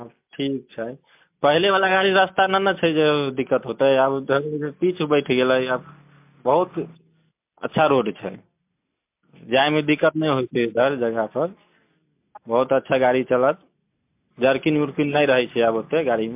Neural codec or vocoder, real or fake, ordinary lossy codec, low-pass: none; real; MP3, 32 kbps; 3.6 kHz